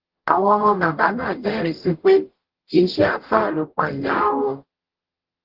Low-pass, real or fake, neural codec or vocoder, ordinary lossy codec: 5.4 kHz; fake; codec, 44.1 kHz, 0.9 kbps, DAC; Opus, 16 kbps